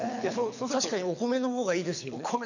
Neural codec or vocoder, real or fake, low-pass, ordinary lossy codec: codec, 24 kHz, 6 kbps, HILCodec; fake; 7.2 kHz; none